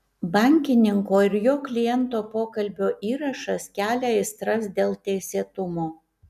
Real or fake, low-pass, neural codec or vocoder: real; 14.4 kHz; none